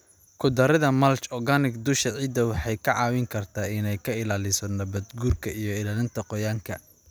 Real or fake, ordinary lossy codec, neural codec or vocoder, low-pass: real; none; none; none